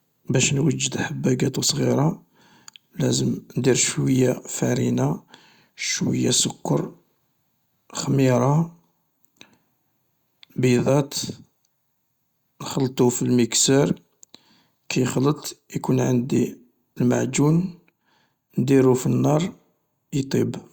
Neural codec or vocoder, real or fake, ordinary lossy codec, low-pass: none; real; Opus, 64 kbps; 19.8 kHz